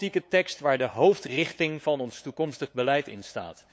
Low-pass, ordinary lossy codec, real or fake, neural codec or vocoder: none; none; fake; codec, 16 kHz, 8 kbps, FunCodec, trained on LibriTTS, 25 frames a second